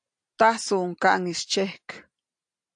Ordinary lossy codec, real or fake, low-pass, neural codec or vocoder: AAC, 64 kbps; real; 9.9 kHz; none